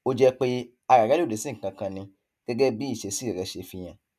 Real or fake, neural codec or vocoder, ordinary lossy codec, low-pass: real; none; none; 14.4 kHz